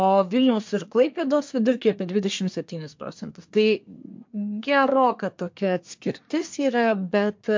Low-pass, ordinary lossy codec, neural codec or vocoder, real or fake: 7.2 kHz; MP3, 48 kbps; codec, 24 kHz, 1 kbps, SNAC; fake